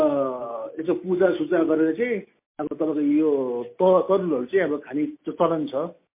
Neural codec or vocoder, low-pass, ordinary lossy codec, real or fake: none; 3.6 kHz; MP3, 24 kbps; real